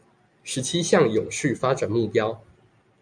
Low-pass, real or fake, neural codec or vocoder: 9.9 kHz; real; none